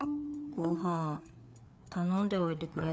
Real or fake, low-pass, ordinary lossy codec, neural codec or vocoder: fake; none; none; codec, 16 kHz, 4 kbps, FreqCodec, larger model